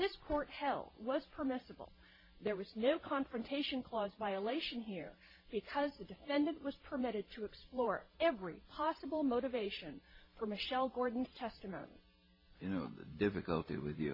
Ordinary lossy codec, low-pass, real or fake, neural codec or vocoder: MP3, 24 kbps; 5.4 kHz; fake; vocoder, 44.1 kHz, 128 mel bands every 512 samples, BigVGAN v2